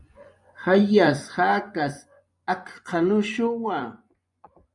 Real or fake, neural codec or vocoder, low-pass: fake; vocoder, 24 kHz, 100 mel bands, Vocos; 10.8 kHz